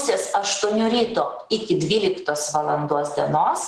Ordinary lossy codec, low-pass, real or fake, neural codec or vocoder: Opus, 16 kbps; 10.8 kHz; real; none